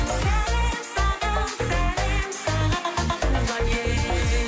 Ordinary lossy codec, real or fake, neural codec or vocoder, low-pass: none; real; none; none